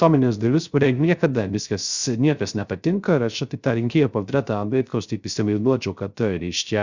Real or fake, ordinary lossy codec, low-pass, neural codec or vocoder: fake; Opus, 64 kbps; 7.2 kHz; codec, 16 kHz, 0.3 kbps, FocalCodec